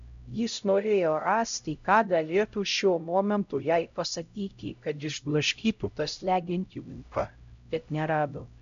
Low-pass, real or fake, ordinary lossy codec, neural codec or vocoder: 7.2 kHz; fake; AAC, 64 kbps; codec, 16 kHz, 0.5 kbps, X-Codec, HuBERT features, trained on LibriSpeech